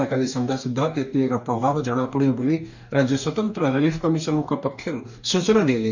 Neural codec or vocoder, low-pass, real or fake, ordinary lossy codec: codec, 44.1 kHz, 2.6 kbps, DAC; 7.2 kHz; fake; none